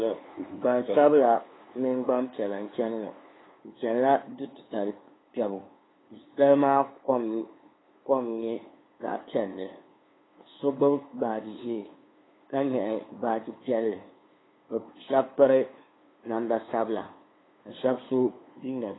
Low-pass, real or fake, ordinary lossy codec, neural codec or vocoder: 7.2 kHz; fake; AAC, 16 kbps; codec, 16 kHz, 2 kbps, FunCodec, trained on LibriTTS, 25 frames a second